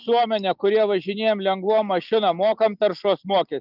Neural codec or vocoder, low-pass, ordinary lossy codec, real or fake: none; 5.4 kHz; Opus, 24 kbps; real